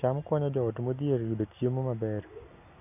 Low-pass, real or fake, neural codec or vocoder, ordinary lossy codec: 3.6 kHz; real; none; none